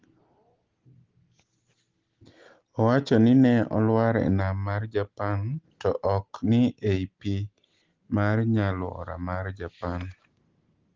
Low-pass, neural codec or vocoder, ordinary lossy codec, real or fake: 7.2 kHz; none; Opus, 16 kbps; real